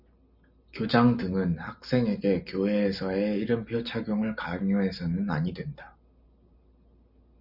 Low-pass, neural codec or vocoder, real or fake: 5.4 kHz; none; real